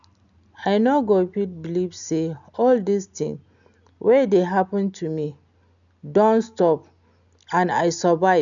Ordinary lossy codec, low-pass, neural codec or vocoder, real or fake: none; 7.2 kHz; none; real